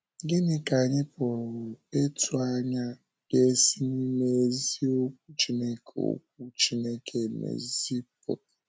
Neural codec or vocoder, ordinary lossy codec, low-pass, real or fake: none; none; none; real